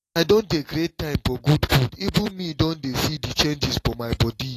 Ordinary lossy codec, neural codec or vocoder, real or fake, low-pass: AAC, 48 kbps; none; real; 14.4 kHz